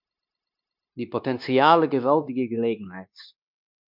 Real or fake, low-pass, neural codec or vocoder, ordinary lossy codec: fake; 5.4 kHz; codec, 16 kHz, 0.9 kbps, LongCat-Audio-Codec; MP3, 48 kbps